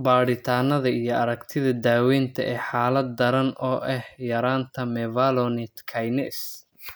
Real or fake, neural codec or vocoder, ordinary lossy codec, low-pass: real; none; none; none